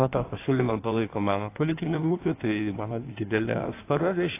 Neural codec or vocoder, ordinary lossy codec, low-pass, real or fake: codec, 16 kHz in and 24 kHz out, 1.1 kbps, FireRedTTS-2 codec; AAC, 24 kbps; 3.6 kHz; fake